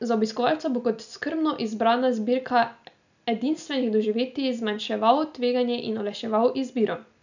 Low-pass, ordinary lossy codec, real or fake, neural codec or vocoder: 7.2 kHz; none; real; none